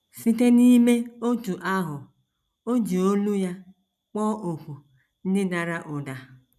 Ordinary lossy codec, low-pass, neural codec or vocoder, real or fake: none; 14.4 kHz; none; real